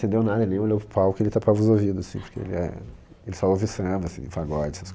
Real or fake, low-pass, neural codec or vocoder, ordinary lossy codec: real; none; none; none